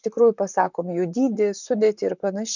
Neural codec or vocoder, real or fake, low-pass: vocoder, 44.1 kHz, 128 mel bands, Pupu-Vocoder; fake; 7.2 kHz